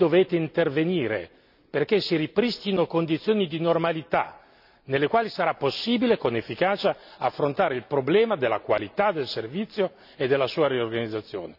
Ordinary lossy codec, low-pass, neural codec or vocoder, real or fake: none; 5.4 kHz; none; real